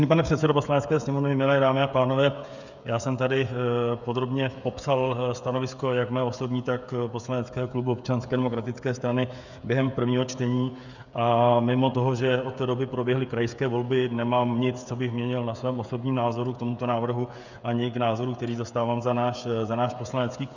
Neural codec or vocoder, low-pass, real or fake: codec, 16 kHz, 16 kbps, FreqCodec, smaller model; 7.2 kHz; fake